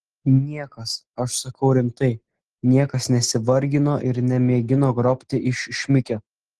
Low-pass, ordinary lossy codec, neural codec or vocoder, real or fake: 10.8 kHz; Opus, 16 kbps; none; real